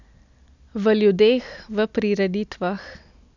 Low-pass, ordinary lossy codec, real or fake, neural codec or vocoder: 7.2 kHz; none; real; none